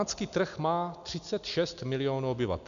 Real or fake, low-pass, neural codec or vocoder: real; 7.2 kHz; none